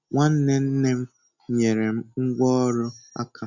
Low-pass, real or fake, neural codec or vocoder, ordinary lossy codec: 7.2 kHz; real; none; none